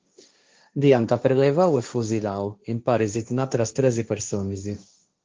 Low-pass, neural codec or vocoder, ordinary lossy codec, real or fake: 7.2 kHz; codec, 16 kHz, 1.1 kbps, Voila-Tokenizer; Opus, 24 kbps; fake